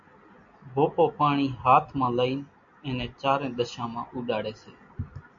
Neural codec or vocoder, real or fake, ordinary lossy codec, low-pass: none; real; AAC, 64 kbps; 7.2 kHz